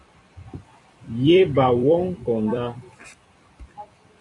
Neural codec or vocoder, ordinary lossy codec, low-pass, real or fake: vocoder, 44.1 kHz, 128 mel bands every 256 samples, BigVGAN v2; AAC, 48 kbps; 10.8 kHz; fake